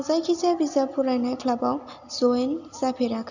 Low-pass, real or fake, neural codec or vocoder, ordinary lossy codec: 7.2 kHz; real; none; none